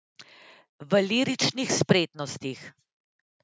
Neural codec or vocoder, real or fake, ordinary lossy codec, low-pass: none; real; none; none